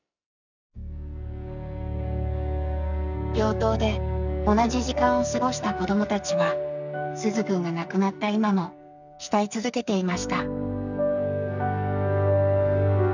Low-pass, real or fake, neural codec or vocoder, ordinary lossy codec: 7.2 kHz; fake; codec, 44.1 kHz, 2.6 kbps, SNAC; none